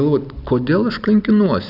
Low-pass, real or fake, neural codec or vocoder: 5.4 kHz; real; none